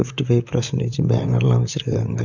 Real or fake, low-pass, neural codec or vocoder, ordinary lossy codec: fake; 7.2 kHz; vocoder, 44.1 kHz, 128 mel bands, Pupu-Vocoder; none